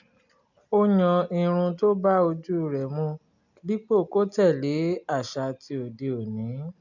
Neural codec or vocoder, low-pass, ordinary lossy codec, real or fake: none; 7.2 kHz; none; real